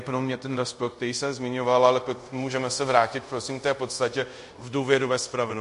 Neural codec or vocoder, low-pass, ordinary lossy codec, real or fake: codec, 24 kHz, 0.5 kbps, DualCodec; 10.8 kHz; MP3, 48 kbps; fake